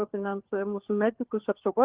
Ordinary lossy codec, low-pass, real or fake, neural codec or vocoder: Opus, 16 kbps; 3.6 kHz; fake; codec, 16 kHz, 4 kbps, FunCodec, trained on LibriTTS, 50 frames a second